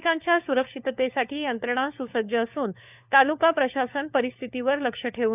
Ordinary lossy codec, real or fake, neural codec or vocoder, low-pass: none; fake; codec, 16 kHz, 4 kbps, FunCodec, trained on LibriTTS, 50 frames a second; 3.6 kHz